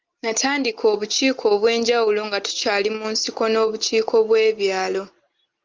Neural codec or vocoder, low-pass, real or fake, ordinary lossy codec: none; 7.2 kHz; real; Opus, 24 kbps